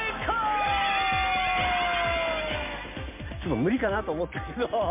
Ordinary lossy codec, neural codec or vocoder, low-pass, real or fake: MP3, 32 kbps; none; 3.6 kHz; real